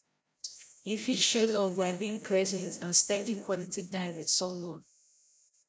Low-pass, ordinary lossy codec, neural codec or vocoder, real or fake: none; none; codec, 16 kHz, 0.5 kbps, FreqCodec, larger model; fake